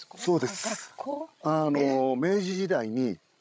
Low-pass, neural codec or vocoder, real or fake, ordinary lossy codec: none; codec, 16 kHz, 16 kbps, FreqCodec, larger model; fake; none